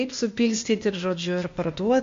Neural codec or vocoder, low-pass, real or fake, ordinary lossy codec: codec, 16 kHz, 0.8 kbps, ZipCodec; 7.2 kHz; fake; MP3, 48 kbps